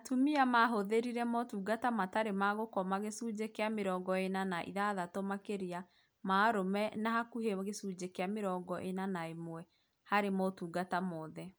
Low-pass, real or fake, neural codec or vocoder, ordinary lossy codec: none; real; none; none